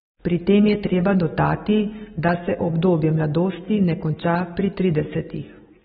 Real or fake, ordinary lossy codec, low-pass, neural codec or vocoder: real; AAC, 16 kbps; 19.8 kHz; none